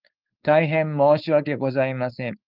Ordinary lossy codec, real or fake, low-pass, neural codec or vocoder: Opus, 32 kbps; fake; 5.4 kHz; codec, 16 kHz, 4.8 kbps, FACodec